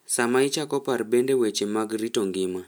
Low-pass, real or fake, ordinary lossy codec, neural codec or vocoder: none; real; none; none